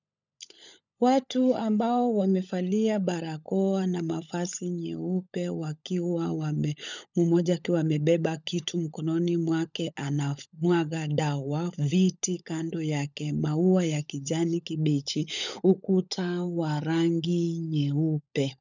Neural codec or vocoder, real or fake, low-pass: codec, 16 kHz, 16 kbps, FunCodec, trained on LibriTTS, 50 frames a second; fake; 7.2 kHz